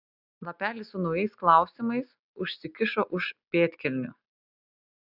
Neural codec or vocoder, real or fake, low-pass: none; real; 5.4 kHz